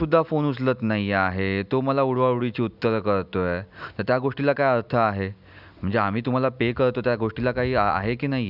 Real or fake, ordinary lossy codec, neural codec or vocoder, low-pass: real; none; none; 5.4 kHz